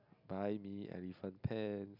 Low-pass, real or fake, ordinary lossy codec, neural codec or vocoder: 5.4 kHz; real; none; none